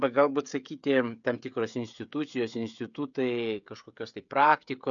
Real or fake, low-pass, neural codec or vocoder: fake; 7.2 kHz; codec, 16 kHz, 16 kbps, FreqCodec, smaller model